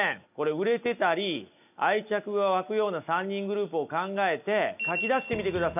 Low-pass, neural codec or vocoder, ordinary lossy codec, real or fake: 3.6 kHz; none; none; real